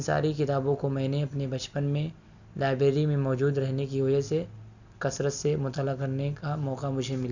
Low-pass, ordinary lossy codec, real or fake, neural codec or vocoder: 7.2 kHz; none; real; none